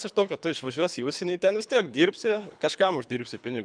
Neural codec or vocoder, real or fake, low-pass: codec, 24 kHz, 3 kbps, HILCodec; fake; 9.9 kHz